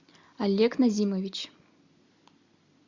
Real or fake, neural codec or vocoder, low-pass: real; none; 7.2 kHz